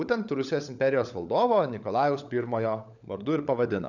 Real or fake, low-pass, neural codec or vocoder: fake; 7.2 kHz; codec, 16 kHz, 16 kbps, FunCodec, trained on LibriTTS, 50 frames a second